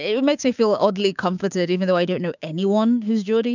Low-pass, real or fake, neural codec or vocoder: 7.2 kHz; fake; codec, 16 kHz, 6 kbps, DAC